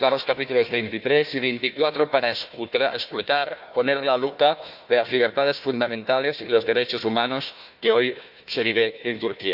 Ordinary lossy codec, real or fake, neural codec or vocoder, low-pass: none; fake; codec, 16 kHz, 1 kbps, FunCodec, trained on Chinese and English, 50 frames a second; 5.4 kHz